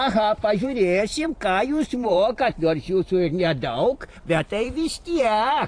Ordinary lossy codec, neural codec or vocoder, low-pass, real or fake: AAC, 48 kbps; vocoder, 44.1 kHz, 128 mel bands every 512 samples, BigVGAN v2; 9.9 kHz; fake